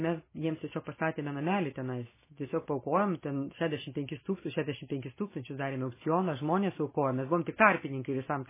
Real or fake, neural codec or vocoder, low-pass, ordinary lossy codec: real; none; 3.6 kHz; MP3, 16 kbps